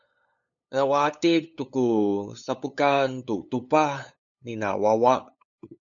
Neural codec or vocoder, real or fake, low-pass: codec, 16 kHz, 8 kbps, FunCodec, trained on LibriTTS, 25 frames a second; fake; 7.2 kHz